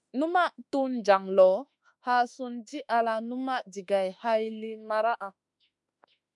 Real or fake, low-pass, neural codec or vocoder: fake; 10.8 kHz; autoencoder, 48 kHz, 32 numbers a frame, DAC-VAE, trained on Japanese speech